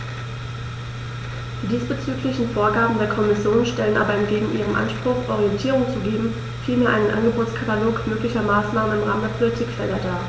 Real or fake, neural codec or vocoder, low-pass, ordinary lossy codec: real; none; none; none